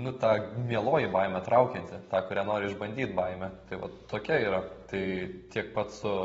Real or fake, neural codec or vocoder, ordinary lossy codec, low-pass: real; none; AAC, 24 kbps; 19.8 kHz